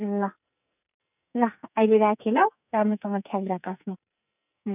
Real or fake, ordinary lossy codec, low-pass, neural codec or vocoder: fake; none; 3.6 kHz; codec, 44.1 kHz, 2.6 kbps, SNAC